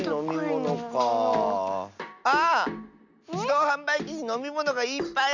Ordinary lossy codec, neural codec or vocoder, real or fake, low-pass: none; none; real; 7.2 kHz